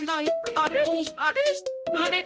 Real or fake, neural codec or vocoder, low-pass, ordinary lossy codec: fake; codec, 16 kHz, 0.5 kbps, X-Codec, HuBERT features, trained on balanced general audio; none; none